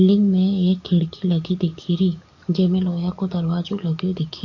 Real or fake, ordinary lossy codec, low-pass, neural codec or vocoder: fake; AAC, 48 kbps; 7.2 kHz; codec, 44.1 kHz, 7.8 kbps, DAC